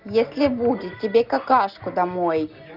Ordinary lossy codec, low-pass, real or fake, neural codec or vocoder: Opus, 24 kbps; 5.4 kHz; real; none